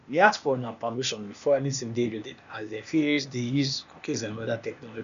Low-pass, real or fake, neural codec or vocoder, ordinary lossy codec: 7.2 kHz; fake; codec, 16 kHz, 0.8 kbps, ZipCodec; none